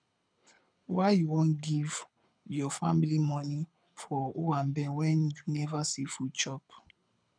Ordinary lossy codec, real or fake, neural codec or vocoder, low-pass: none; fake; codec, 24 kHz, 6 kbps, HILCodec; 9.9 kHz